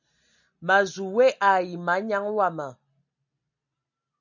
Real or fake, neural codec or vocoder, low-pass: real; none; 7.2 kHz